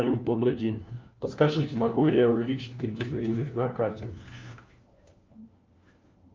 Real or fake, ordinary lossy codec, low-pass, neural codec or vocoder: fake; Opus, 24 kbps; 7.2 kHz; codec, 16 kHz, 1 kbps, FunCodec, trained on LibriTTS, 50 frames a second